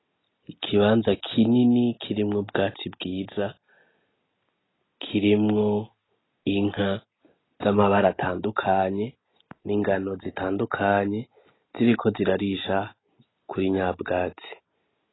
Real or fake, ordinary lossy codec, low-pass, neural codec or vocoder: real; AAC, 16 kbps; 7.2 kHz; none